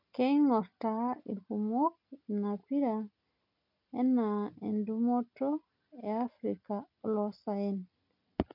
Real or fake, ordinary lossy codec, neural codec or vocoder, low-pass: real; none; none; 5.4 kHz